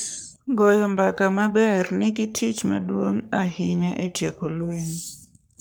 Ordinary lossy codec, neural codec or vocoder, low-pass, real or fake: none; codec, 44.1 kHz, 3.4 kbps, Pupu-Codec; none; fake